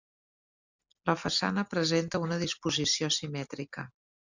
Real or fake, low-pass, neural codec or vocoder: real; 7.2 kHz; none